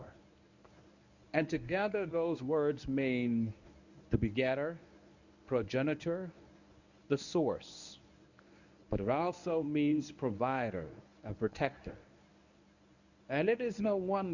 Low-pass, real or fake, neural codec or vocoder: 7.2 kHz; fake; codec, 24 kHz, 0.9 kbps, WavTokenizer, medium speech release version 1